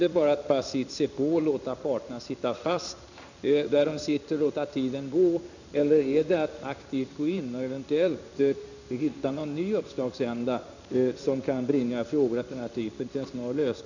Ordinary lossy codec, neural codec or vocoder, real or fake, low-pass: none; codec, 16 kHz in and 24 kHz out, 1 kbps, XY-Tokenizer; fake; 7.2 kHz